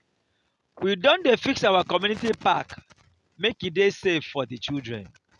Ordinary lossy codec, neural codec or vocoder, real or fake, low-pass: none; none; real; 10.8 kHz